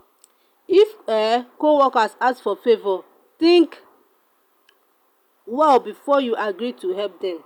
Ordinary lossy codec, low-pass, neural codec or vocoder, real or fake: none; 19.8 kHz; none; real